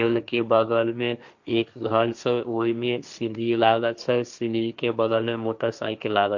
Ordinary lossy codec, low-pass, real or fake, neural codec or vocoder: none; 7.2 kHz; fake; codec, 16 kHz, 1.1 kbps, Voila-Tokenizer